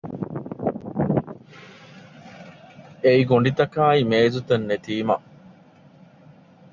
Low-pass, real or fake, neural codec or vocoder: 7.2 kHz; real; none